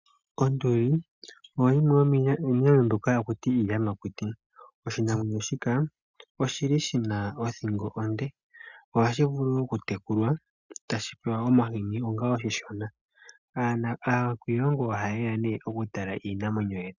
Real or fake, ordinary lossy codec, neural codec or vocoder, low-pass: real; Opus, 64 kbps; none; 7.2 kHz